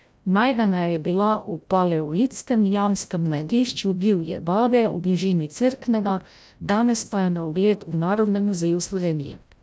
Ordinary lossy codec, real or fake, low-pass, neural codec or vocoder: none; fake; none; codec, 16 kHz, 0.5 kbps, FreqCodec, larger model